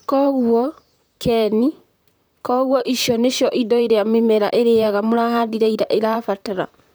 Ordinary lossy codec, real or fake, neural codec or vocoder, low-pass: none; fake; vocoder, 44.1 kHz, 128 mel bands, Pupu-Vocoder; none